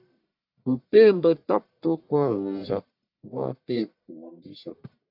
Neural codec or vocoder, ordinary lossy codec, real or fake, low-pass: codec, 44.1 kHz, 1.7 kbps, Pupu-Codec; AAC, 48 kbps; fake; 5.4 kHz